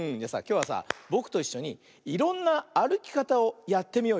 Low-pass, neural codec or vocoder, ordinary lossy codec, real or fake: none; none; none; real